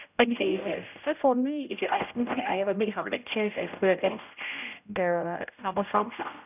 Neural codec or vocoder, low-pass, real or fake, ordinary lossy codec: codec, 16 kHz, 0.5 kbps, X-Codec, HuBERT features, trained on general audio; 3.6 kHz; fake; none